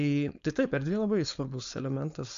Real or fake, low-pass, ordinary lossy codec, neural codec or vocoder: fake; 7.2 kHz; MP3, 64 kbps; codec, 16 kHz, 4.8 kbps, FACodec